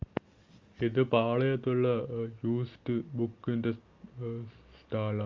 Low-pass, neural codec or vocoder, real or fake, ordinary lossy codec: 7.2 kHz; none; real; Opus, 32 kbps